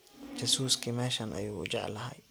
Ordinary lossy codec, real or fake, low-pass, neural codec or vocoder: none; real; none; none